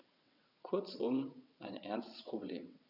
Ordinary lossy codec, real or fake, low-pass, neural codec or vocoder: none; fake; 5.4 kHz; vocoder, 22.05 kHz, 80 mel bands, WaveNeXt